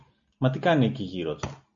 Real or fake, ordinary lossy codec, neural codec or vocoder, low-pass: real; AAC, 48 kbps; none; 7.2 kHz